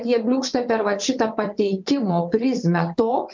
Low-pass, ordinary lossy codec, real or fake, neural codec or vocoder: 7.2 kHz; MP3, 64 kbps; fake; vocoder, 22.05 kHz, 80 mel bands, WaveNeXt